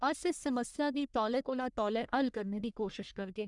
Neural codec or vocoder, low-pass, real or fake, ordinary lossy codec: codec, 44.1 kHz, 1.7 kbps, Pupu-Codec; 10.8 kHz; fake; none